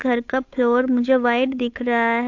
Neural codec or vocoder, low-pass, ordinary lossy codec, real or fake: codec, 16 kHz, 8 kbps, FunCodec, trained on Chinese and English, 25 frames a second; 7.2 kHz; AAC, 48 kbps; fake